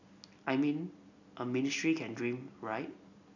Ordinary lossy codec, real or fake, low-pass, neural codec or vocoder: none; real; 7.2 kHz; none